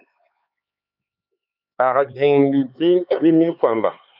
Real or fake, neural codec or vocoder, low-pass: fake; codec, 16 kHz, 4 kbps, X-Codec, HuBERT features, trained on LibriSpeech; 5.4 kHz